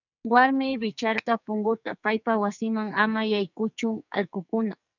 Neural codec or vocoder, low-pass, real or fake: codec, 44.1 kHz, 2.6 kbps, SNAC; 7.2 kHz; fake